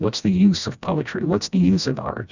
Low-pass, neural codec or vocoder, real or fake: 7.2 kHz; codec, 16 kHz, 1 kbps, FreqCodec, smaller model; fake